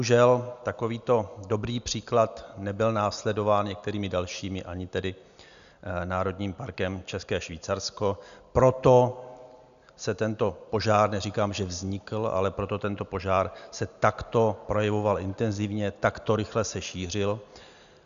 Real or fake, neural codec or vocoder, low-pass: real; none; 7.2 kHz